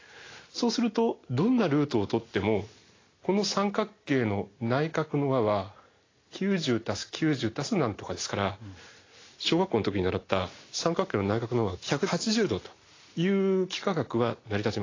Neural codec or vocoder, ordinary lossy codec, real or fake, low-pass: none; AAC, 32 kbps; real; 7.2 kHz